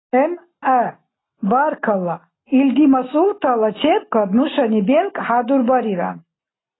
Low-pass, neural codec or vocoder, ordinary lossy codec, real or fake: 7.2 kHz; none; AAC, 16 kbps; real